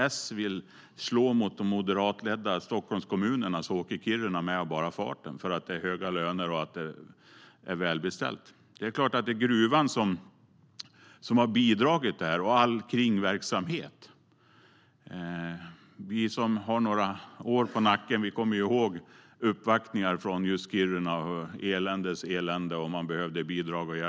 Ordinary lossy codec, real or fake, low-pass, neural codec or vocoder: none; real; none; none